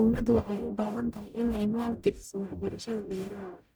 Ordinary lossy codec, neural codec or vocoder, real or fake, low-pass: none; codec, 44.1 kHz, 0.9 kbps, DAC; fake; none